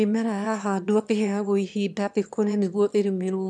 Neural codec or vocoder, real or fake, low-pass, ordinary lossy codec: autoencoder, 22.05 kHz, a latent of 192 numbers a frame, VITS, trained on one speaker; fake; none; none